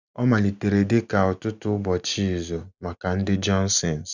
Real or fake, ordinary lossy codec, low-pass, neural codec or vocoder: real; none; 7.2 kHz; none